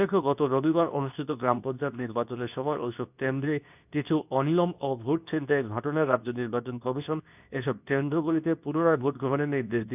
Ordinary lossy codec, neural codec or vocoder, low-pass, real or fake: none; codec, 24 kHz, 0.9 kbps, WavTokenizer, medium speech release version 2; 3.6 kHz; fake